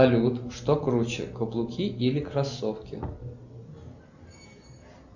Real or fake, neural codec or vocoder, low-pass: real; none; 7.2 kHz